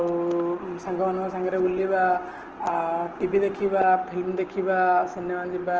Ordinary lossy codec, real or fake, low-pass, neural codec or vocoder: Opus, 16 kbps; real; 7.2 kHz; none